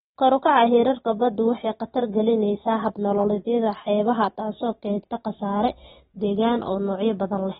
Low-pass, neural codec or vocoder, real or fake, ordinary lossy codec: 7.2 kHz; none; real; AAC, 16 kbps